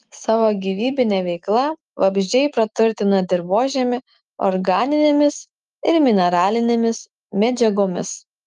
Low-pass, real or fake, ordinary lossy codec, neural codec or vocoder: 10.8 kHz; fake; Opus, 32 kbps; autoencoder, 48 kHz, 128 numbers a frame, DAC-VAE, trained on Japanese speech